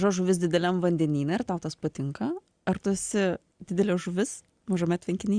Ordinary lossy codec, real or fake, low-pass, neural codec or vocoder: Opus, 64 kbps; real; 9.9 kHz; none